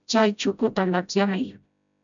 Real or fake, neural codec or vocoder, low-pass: fake; codec, 16 kHz, 0.5 kbps, FreqCodec, smaller model; 7.2 kHz